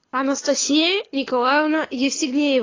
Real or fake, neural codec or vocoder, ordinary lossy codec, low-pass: fake; codec, 24 kHz, 6 kbps, HILCodec; AAC, 32 kbps; 7.2 kHz